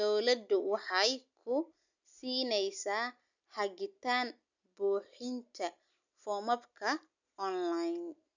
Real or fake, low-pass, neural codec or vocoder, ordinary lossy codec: real; 7.2 kHz; none; none